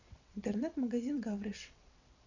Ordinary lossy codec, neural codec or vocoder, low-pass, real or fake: none; vocoder, 22.05 kHz, 80 mel bands, WaveNeXt; 7.2 kHz; fake